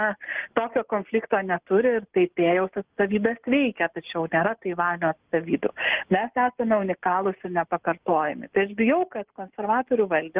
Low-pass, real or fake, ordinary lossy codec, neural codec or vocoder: 3.6 kHz; real; Opus, 32 kbps; none